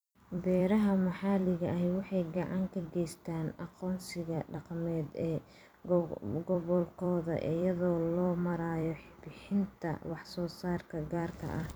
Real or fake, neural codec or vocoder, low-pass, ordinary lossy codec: fake; vocoder, 44.1 kHz, 128 mel bands every 256 samples, BigVGAN v2; none; none